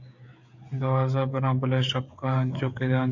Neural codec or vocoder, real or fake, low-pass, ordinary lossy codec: codec, 16 kHz, 16 kbps, FreqCodec, smaller model; fake; 7.2 kHz; AAC, 48 kbps